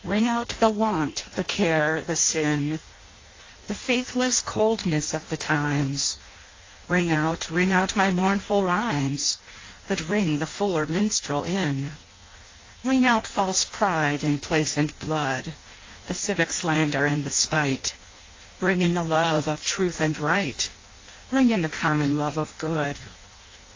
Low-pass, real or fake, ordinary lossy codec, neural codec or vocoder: 7.2 kHz; fake; AAC, 32 kbps; codec, 16 kHz in and 24 kHz out, 0.6 kbps, FireRedTTS-2 codec